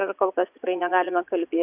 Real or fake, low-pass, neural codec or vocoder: fake; 3.6 kHz; vocoder, 22.05 kHz, 80 mel bands, Vocos